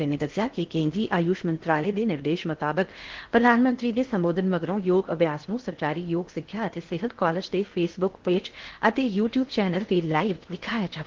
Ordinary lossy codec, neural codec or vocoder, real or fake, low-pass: Opus, 16 kbps; codec, 16 kHz in and 24 kHz out, 0.6 kbps, FocalCodec, streaming, 4096 codes; fake; 7.2 kHz